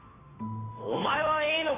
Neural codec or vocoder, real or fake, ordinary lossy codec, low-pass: codec, 16 kHz, 2 kbps, FunCodec, trained on Chinese and English, 25 frames a second; fake; AAC, 16 kbps; 3.6 kHz